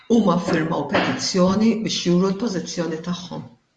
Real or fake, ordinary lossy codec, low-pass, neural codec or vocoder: fake; Opus, 64 kbps; 10.8 kHz; vocoder, 44.1 kHz, 128 mel bands every 512 samples, BigVGAN v2